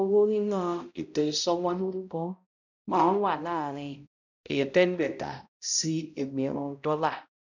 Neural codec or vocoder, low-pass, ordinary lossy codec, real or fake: codec, 16 kHz, 0.5 kbps, X-Codec, HuBERT features, trained on balanced general audio; 7.2 kHz; none; fake